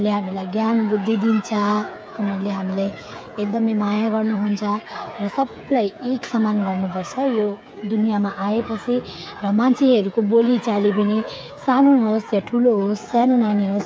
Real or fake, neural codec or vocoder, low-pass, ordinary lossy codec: fake; codec, 16 kHz, 8 kbps, FreqCodec, smaller model; none; none